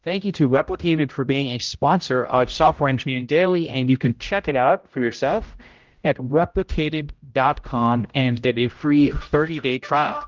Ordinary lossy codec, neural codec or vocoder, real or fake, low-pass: Opus, 32 kbps; codec, 16 kHz, 0.5 kbps, X-Codec, HuBERT features, trained on general audio; fake; 7.2 kHz